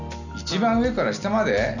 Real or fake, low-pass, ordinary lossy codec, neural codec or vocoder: real; 7.2 kHz; none; none